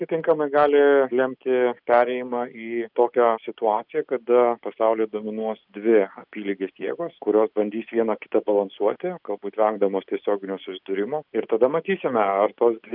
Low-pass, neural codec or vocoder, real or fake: 5.4 kHz; none; real